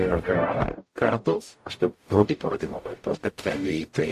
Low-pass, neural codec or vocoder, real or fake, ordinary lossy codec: 14.4 kHz; codec, 44.1 kHz, 0.9 kbps, DAC; fake; AAC, 64 kbps